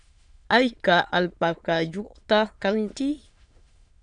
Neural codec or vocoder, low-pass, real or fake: autoencoder, 22.05 kHz, a latent of 192 numbers a frame, VITS, trained on many speakers; 9.9 kHz; fake